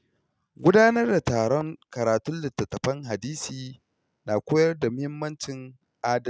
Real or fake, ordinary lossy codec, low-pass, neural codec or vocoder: real; none; none; none